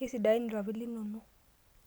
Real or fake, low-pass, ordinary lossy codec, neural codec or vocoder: real; none; none; none